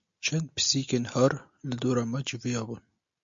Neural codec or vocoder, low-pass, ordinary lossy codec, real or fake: none; 7.2 kHz; MP3, 48 kbps; real